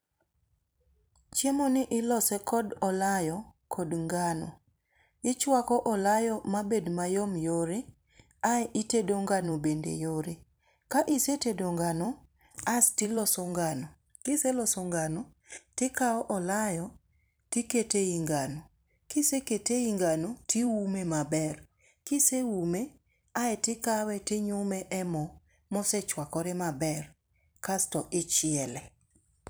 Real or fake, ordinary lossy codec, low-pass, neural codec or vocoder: fake; none; none; vocoder, 44.1 kHz, 128 mel bands every 256 samples, BigVGAN v2